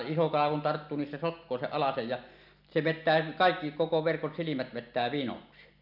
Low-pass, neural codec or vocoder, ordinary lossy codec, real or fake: 5.4 kHz; none; none; real